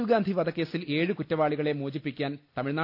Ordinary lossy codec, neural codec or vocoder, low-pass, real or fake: AAC, 48 kbps; none; 5.4 kHz; real